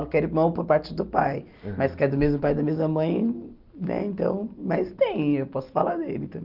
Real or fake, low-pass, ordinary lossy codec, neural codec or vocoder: real; 5.4 kHz; Opus, 24 kbps; none